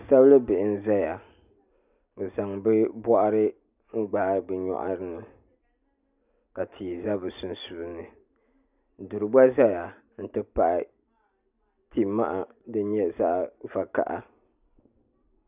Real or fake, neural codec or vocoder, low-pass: real; none; 3.6 kHz